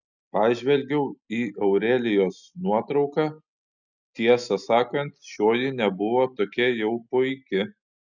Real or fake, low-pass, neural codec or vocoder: real; 7.2 kHz; none